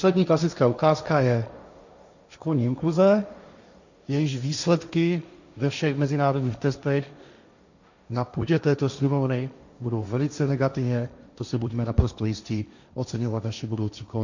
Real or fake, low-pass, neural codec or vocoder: fake; 7.2 kHz; codec, 16 kHz, 1.1 kbps, Voila-Tokenizer